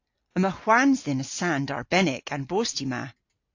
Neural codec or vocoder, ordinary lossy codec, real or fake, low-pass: none; AAC, 48 kbps; real; 7.2 kHz